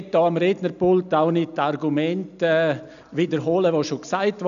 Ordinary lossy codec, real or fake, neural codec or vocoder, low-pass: none; real; none; 7.2 kHz